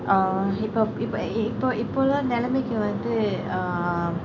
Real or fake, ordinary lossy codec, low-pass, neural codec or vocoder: real; none; 7.2 kHz; none